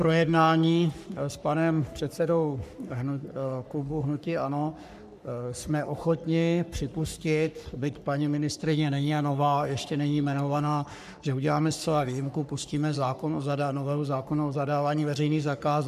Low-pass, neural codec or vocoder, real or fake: 14.4 kHz; codec, 44.1 kHz, 3.4 kbps, Pupu-Codec; fake